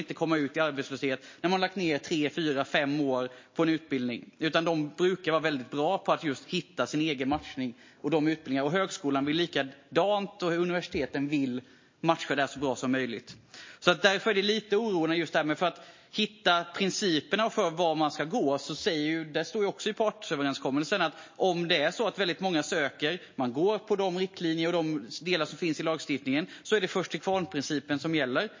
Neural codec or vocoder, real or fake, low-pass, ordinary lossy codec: none; real; 7.2 kHz; MP3, 32 kbps